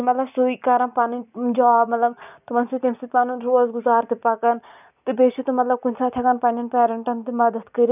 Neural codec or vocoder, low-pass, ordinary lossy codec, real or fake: none; 3.6 kHz; none; real